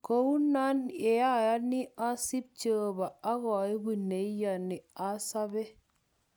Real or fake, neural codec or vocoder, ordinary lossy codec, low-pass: real; none; none; none